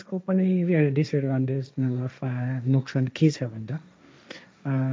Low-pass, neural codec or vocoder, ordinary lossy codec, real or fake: none; codec, 16 kHz, 1.1 kbps, Voila-Tokenizer; none; fake